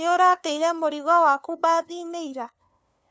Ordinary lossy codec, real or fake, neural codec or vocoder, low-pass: none; fake; codec, 16 kHz, 2 kbps, FunCodec, trained on LibriTTS, 25 frames a second; none